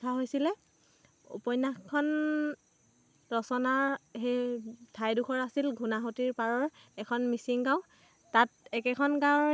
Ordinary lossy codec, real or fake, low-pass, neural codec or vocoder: none; real; none; none